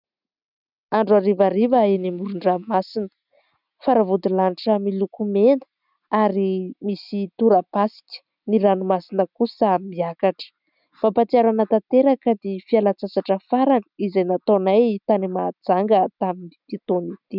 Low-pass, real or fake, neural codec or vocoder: 5.4 kHz; real; none